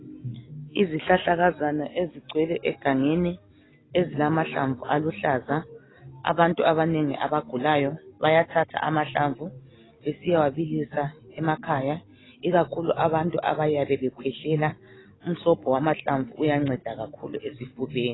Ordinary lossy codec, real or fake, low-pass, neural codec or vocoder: AAC, 16 kbps; real; 7.2 kHz; none